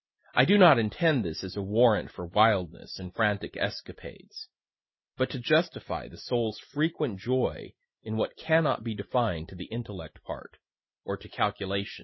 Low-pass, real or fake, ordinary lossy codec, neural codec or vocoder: 7.2 kHz; real; MP3, 24 kbps; none